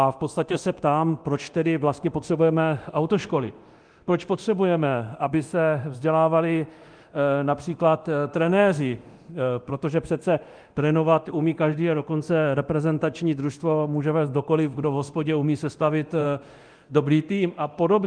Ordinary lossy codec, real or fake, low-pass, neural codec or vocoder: Opus, 24 kbps; fake; 9.9 kHz; codec, 24 kHz, 0.9 kbps, DualCodec